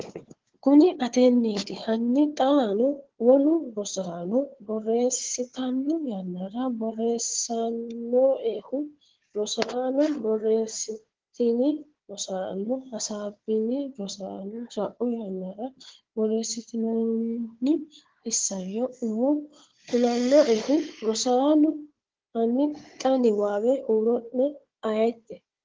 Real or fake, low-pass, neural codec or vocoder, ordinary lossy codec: fake; 7.2 kHz; codec, 16 kHz, 4 kbps, FunCodec, trained on Chinese and English, 50 frames a second; Opus, 16 kbps